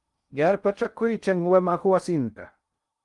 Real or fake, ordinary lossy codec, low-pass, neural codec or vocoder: fake; Opus, 32 kbps; 10.8 kHz; codec, 16 kHz in and 24 kHz out, 0.6 kbps, FocalCodec, streaming, 2048 codes